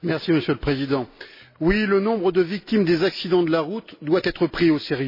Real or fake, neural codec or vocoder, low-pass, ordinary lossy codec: real; none; 5.4 kHz; MP3, 24 kbps